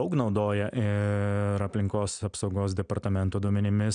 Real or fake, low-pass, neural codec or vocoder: real; 9.9 kHz; none